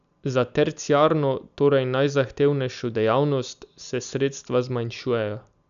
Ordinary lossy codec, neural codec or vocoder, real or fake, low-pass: none; none; real; 7.2 kHz